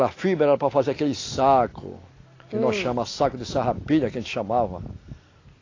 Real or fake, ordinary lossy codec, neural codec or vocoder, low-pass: real; AAC, 32 kbps; none; 7.2 kHz